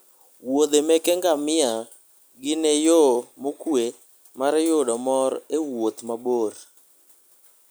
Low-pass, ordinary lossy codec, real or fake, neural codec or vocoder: none; none; real; none